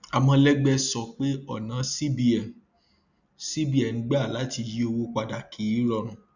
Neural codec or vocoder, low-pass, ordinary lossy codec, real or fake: none; 7.2 kHz; none; real